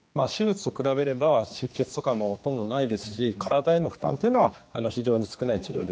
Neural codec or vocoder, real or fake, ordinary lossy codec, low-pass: codec, 16 kHz, 2 kbps, X-Codec, HuBERT features, trained on general audio; fake; none; none